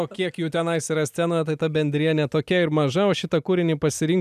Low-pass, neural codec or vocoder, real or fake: 14.4 kHz; none; real